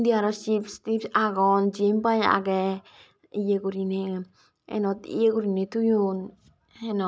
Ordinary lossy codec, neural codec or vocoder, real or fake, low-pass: none; none; real; none